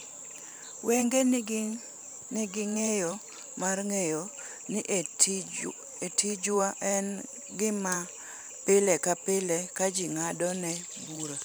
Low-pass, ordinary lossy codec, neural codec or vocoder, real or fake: none; none; vocoder, 44.1 kHz, 128 mel bands every 512 samples, BigVGAN v2; fake